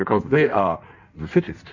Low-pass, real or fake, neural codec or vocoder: 7.2 kHz; fake; codec, 16 kHz in and 24 kHz out, 1.1 kbps, FireRedTTS-2 codec